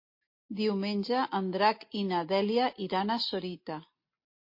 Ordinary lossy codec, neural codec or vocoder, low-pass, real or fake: MP3, 32 kbps; none; 5.4 kHz; real